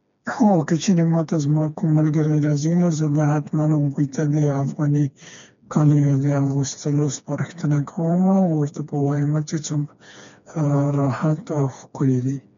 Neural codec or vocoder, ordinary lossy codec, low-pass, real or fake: codec, 16 kHz, 2 kbps, FreqCodec, smaller model; MP3, 64 kbps; 7.2 kHz; fake